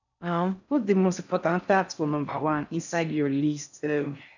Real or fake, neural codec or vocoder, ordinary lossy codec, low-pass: fake; codec, 16 kHz in and 24 kHz out, 0.6 kbps, FocalCodec, streaming, 4096 codes; none; 7.2 kHz